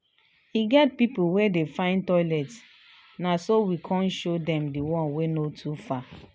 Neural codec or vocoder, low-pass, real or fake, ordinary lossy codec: none; none; real; none